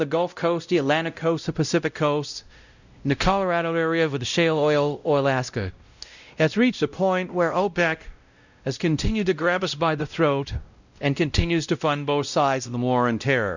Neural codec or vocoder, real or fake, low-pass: codec, 16 kHz, 0.5 kbps, X-Codec, WavLM features, trained on Multilingual LibriSpeech; fake; 7.2 kHz